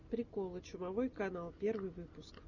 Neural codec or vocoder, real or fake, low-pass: none; real; 7.2 kHz